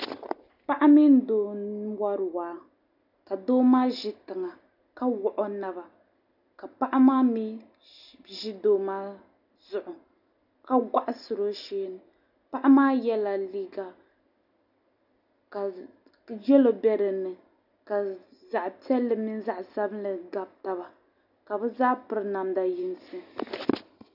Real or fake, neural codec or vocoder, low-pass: real; none; 5.4 kHz